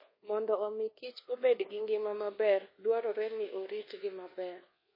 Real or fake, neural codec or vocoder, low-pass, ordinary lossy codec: fake; codec, 24 kHz, 1.2 kbps, DualCodec; 5.4 kHz; MP3, 24 kbps